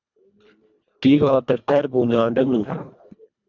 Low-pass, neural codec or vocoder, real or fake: 7.2 kHz; codec, 24 kHz, 1.5 kbps, HILCodec; fake